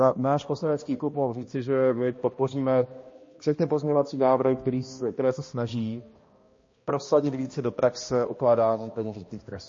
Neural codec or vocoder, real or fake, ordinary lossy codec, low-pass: codec, 16 kHz, 1 kbps, X-Codec, HuBERT features, trained on balanced general audio; fake; MP3, 32 kbps; 7.2 kHz